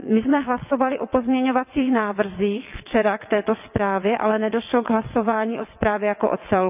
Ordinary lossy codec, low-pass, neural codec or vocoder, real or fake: none; 3.6 kHz; vocoder, 22.05 kHz, 80 mel bands, WaveNeXt; fake